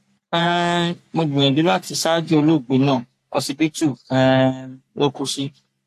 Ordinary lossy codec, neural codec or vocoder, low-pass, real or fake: AAC, 64 kbps; codec, 44.1 kHz, 3.4 kbps, Pupu-Codec; 14.4 kHz; fake